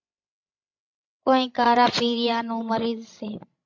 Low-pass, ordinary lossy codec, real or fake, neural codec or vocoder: 7.2 kHz; MP3, 48 kbps; fake; codec, 16 kHz, 16 kbps, FreqCodec, larger model